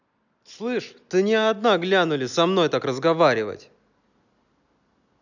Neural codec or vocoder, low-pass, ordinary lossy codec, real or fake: none; 7.2 kHz; none; real